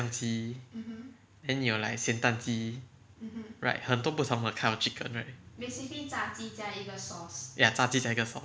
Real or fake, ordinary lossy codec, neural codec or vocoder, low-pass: real; none; none; none